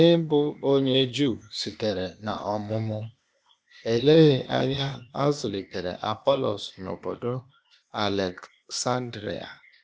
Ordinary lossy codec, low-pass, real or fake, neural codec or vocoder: none; none; fake; codec, 16 kHz, 0.8 kbps, ZipCodec